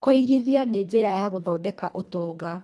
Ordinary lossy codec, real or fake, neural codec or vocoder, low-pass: none; fake; codec, 24 kHz, 1.5 kbps, HILCodec; none